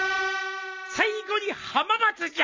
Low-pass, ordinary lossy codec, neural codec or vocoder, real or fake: 7.2 kHz; MP3, 48 kbps; none; real